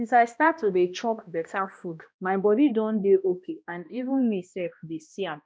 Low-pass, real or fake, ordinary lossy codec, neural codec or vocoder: none; fake; none; codec, 16 kHz, 1 kbps, X-Codec, HuBERT features, trained on balanced general audio